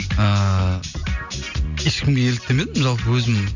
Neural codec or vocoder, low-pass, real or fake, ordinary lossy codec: none; 7.2 kHz; real; none